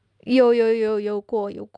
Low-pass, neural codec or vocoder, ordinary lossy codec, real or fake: 9.9 kHz; none; none; real